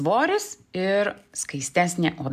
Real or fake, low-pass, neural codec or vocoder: real; 14.4 kHz; none